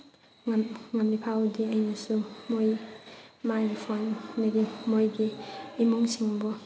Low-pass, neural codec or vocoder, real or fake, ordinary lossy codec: none; none; real; none